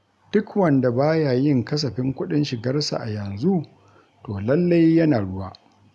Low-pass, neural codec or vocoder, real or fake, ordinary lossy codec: none; none; real; none